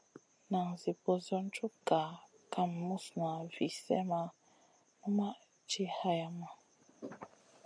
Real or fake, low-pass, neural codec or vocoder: real; 9.9 kHz; none